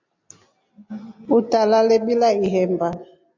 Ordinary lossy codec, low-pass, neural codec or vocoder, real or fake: AAC, 48 kbps; 7.2 kHz; none; real